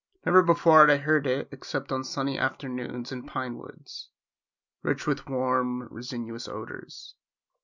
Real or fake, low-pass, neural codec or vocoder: real; 7.2 kHz; none